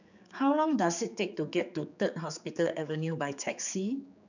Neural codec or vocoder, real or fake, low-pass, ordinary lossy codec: codec, 16 kHz, 4 kbps, X-Codec, HuBERT features, trained on general audio; fake; 7.2 kHz; none